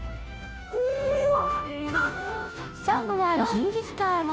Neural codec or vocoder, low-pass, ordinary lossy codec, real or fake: codec, 16 kHz, 0.5 kbps, FunCodec, trained on Chinese and English, 25 frames a second; none; none; fake